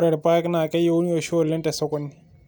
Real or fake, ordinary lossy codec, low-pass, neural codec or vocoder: real; none; none; none